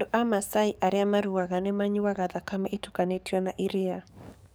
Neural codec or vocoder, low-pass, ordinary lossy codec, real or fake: codec, 44.1 kHz, 7.8 kbps, DAC; none; none; fake